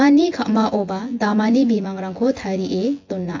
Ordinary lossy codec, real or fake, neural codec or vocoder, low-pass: AAC, 48 kbps; fake; vocoder, 24 kHz, 100 mel bands, Vocos; 7.2 kHz